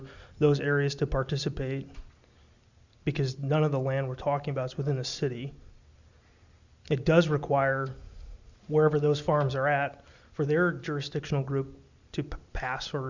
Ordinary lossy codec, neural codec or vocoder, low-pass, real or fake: Opus, 64 kbps; none; 7.2 kHz; real